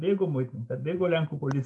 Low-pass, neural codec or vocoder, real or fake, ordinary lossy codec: 10.8 kHz; none; real; MP3, 64 kbps